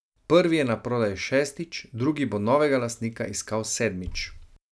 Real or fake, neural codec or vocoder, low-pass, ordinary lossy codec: real; none; none; none